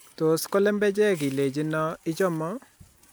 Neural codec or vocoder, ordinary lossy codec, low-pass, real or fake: none; none; none; real